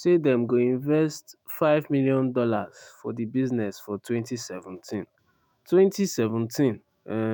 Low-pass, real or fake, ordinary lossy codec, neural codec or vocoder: none; fake; none; autoencoder, 48 kHz, 128 numbers a frame, DAC-VAE, trained on Japanese speech